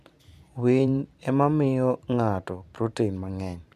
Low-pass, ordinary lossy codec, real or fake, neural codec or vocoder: 14.4 kHz; AAC, 96 kbps; fake; vocoder, 44.1 kHz, 128 mel bands every 512 samples, BigVGAN v2